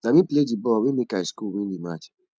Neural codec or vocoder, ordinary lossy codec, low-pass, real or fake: none; none; none; real